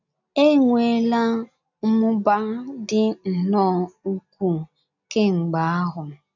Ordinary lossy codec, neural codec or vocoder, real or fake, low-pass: none; none; real; 7.2 kHz